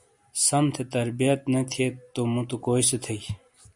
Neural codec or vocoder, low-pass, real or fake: none; 10.8 kHz; real